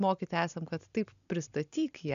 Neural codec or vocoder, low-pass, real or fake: none; 7.2 kHz; real